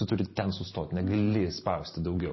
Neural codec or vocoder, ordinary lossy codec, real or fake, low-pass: vocoder, 44.1 kHz, 128 mel bands every 512 samples, BigVGAN v2; MP3, 24 kbps; fake; 7.2 kHz